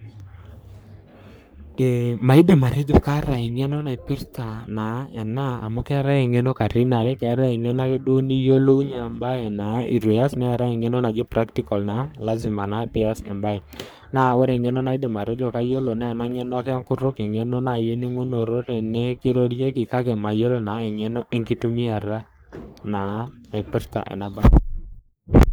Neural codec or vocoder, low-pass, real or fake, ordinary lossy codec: codec, 44.1 kHz, 3.4 kbps, Pupu-Codec; none; fake; none